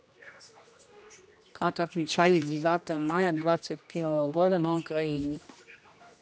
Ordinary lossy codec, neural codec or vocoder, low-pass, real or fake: none; codec, 16 kHz, 1 kbps, X-Codec, HuBERT features, trained on general audio; none; fake